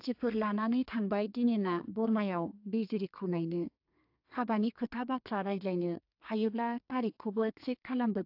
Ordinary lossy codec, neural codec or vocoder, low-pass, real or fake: AAC, 48 kbps; codec, 32 kHz, 1.9 kbps, SNAC; 5.4 kHz; fake